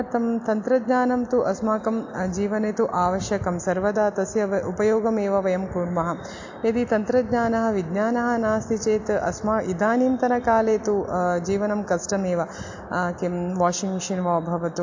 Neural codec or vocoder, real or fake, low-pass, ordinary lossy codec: none; real; 7.2 kHz; AAC, 48 kbps